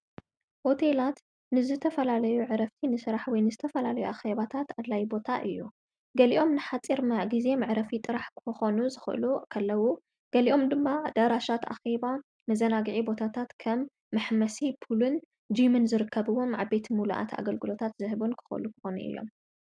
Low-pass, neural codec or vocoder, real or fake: 9.9 kHz; none; real